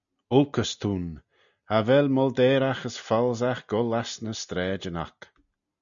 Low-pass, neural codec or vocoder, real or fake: 7.2 kHz; none; real